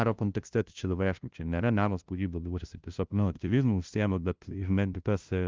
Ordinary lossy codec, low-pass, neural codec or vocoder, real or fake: Opus, 32 kbps; 7.2 kHz; codec, 16 kHz, 0.5 kbps, FunCodec, trained on LibriTTS, 25 frames a second; fake